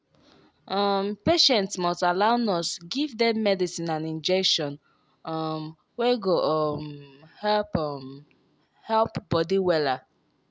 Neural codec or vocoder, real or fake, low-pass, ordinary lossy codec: none; real; none; none